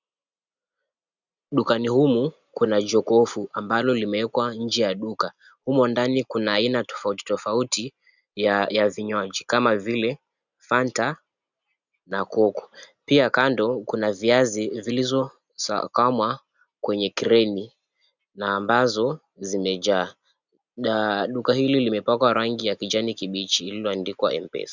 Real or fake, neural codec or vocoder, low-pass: real; none; 7.2 kHz